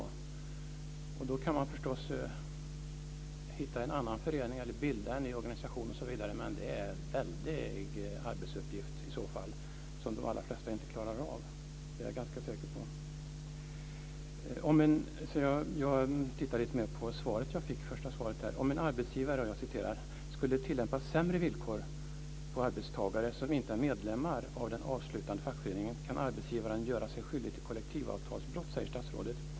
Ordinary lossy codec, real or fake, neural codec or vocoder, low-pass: none; real; none; none